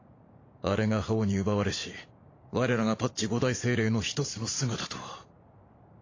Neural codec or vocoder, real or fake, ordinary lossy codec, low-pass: vocoder, 44.1 kHz, 128 mel bands every 512 samples, BigVGAN v2; fake; AAC, 32 kbps; 7.2 kHz